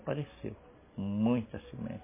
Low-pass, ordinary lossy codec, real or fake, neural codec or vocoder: 3.6 kHz; MP3, 16 kbps; real; none